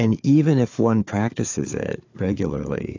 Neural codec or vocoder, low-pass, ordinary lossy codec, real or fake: codec, 16 kHz, 4 kbps, FunCodec, trained on Chinese and English, 50 frames a second; 7.2 kHz; AAC, 48 kbps; fake